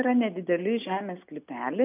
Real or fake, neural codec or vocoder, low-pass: real; none; 3.6 kHz